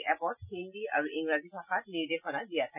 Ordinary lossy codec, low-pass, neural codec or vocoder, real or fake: none; 3.6 kHz; none; real